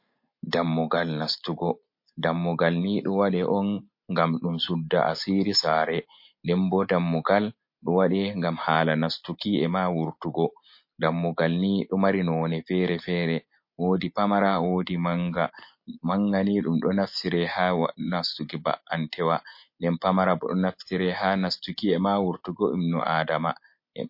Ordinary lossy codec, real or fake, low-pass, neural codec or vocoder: MP3, 32 kbps; real; 5.4 kHz; none